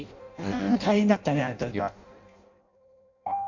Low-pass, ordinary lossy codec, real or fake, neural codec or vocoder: 7.2 kHz; none; fake; codec, 16 kHz in and 24 kHz out, 0.6 kbps, FireRedTTS-2 codec